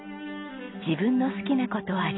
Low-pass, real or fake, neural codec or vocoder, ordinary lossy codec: 7.2 kHz; real; none; AAC, 16 kbps